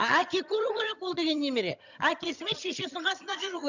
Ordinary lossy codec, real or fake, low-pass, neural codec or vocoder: none; fake; 7.2 kHz; vocoder, 22.05 kHz, 80 mel bands, HiFi-GAN